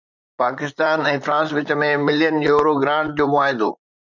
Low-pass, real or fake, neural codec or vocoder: 7.2 kHz; fake; vocoder, 44.1 kHz, 128 mel bands, Pupu-Vocoder